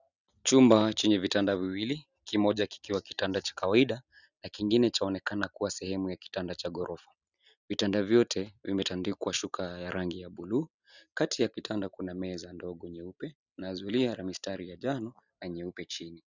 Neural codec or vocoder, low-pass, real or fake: none; 7.2 kHz; real